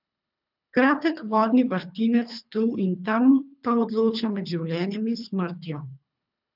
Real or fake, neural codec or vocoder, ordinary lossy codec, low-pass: fake; codec, 24 kHz, 3 kbps, HILCodec; none; 5.4 kHz